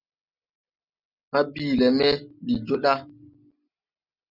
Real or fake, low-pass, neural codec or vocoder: real; 5.4 kHz; none